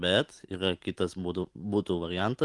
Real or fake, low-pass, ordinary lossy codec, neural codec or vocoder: real; 10.8 kHz; Opus, 24 kbps; none